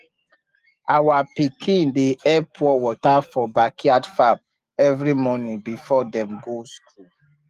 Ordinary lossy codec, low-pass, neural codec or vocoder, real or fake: Opus, 32 kbps; 14.4 kHz; codec, 44.1 kHz, 7.8 kbps, DAC; fake